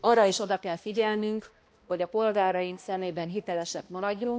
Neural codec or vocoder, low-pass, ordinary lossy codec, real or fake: codec, 16 kHz, 1 kbps, X-Codec, HuBERT features, trained on balanced general audio; none; none; fake